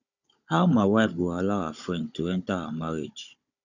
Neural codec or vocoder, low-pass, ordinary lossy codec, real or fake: codec, 16 kHz, 16 kbps, FunCodec, trained on Chinese and English, 50 frames a second; 7.2 kHz; none; fake